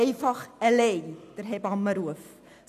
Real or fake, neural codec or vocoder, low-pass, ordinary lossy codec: real; none; 14.4 kHz; none